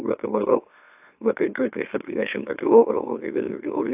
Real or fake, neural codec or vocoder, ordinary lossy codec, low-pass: fake; autoencoder, 44.1 kHz, a latent of 192 numbers a frame, MeloTTS; AAC, 32 kbps; 3.6 kHz